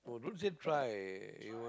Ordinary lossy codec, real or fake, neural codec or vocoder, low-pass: none; real; none; none